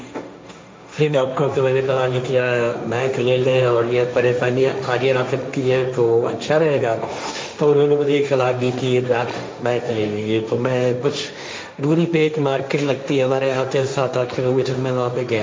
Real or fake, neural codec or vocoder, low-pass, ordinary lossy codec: fake; codec, 16 kHz, 1.1 kbps, Voila-Tokenizer; none; none